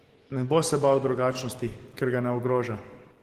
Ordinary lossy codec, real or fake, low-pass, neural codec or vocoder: Opus, 16 kbps; fake; 14.4 kHz; codec, 44.1 kHz, 7.8 kbps, DAC